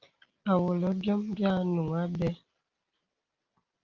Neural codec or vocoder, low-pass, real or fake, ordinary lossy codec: none; 7.2 kHz; real; Opus, 24 kbps